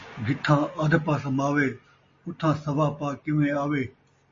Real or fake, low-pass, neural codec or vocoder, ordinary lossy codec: real; 7.2 kHz; none; MP3, 32 kbps